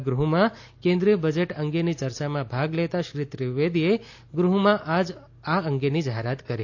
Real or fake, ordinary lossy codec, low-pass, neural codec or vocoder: real; AAC, 48 kbps; 7.2 kHz; none